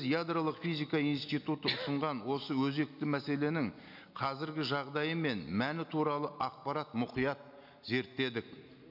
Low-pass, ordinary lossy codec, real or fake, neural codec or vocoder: 5.4 kHz; none; real; none